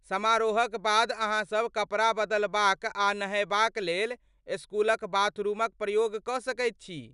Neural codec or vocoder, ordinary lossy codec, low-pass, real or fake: none; none; 10.8 kHz; real